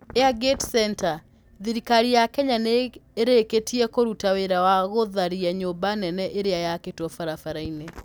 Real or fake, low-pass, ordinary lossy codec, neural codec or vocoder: real; none; none; none